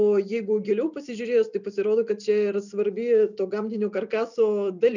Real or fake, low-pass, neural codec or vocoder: real; 7.2 kHz; none